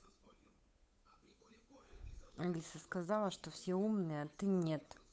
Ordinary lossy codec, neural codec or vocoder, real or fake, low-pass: none; codec, 16 kHz, 8 kbps, FunCodec, trained on Chinese and English, 25 frames a second; fake; none